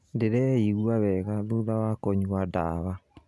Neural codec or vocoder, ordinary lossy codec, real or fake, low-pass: none; none; real; none